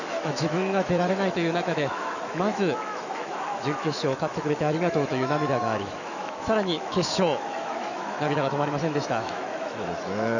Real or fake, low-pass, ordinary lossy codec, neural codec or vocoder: fake; 7.2 kHz; none; autoencoder, 48 kHz, 128 numbers a frame, DAC-VAE, trained on Japanese speech